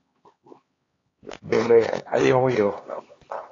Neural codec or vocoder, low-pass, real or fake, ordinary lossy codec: codec, 16 kHz, 2 kbps, X-Codec, HuBERT features, trained on LibriSpeech; 7.2 kHz; fake; AAC, 32 kbps